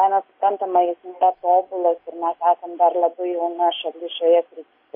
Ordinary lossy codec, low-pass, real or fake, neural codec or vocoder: MP3, 24 kbps; 5.4 kHz; real; none